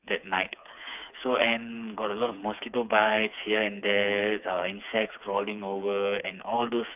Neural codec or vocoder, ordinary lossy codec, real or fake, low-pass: codec, 16 kHz, 4 kbps, FreqCodec, smaller model; none; fake; 3.6 kHz